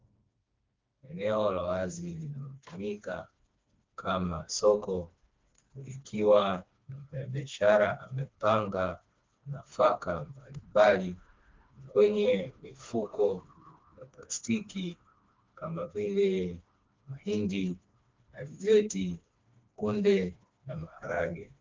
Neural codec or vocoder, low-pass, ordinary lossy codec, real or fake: codec, 16 kHz, 2 kbps, FreqCodec, smaller model; 7.2 kHz; Opus, 24 kbps; fake